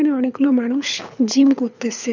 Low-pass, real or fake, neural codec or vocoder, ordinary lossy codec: 7.2 kHz; fake; codec, 24 kHz, 6 kbps, HILCodec; none